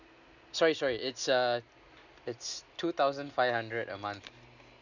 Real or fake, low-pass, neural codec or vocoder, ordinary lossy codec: real; 7.2 kHz; none; none